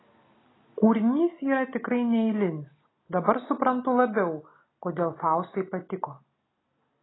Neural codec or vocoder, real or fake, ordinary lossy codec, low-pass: none; real; AAC, 16 kbps; 7.2 kHz